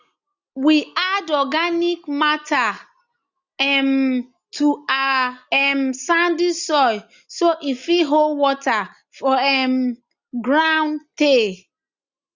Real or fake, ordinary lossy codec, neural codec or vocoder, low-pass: real; Opus, 64 kbps; none; 7.2 kHz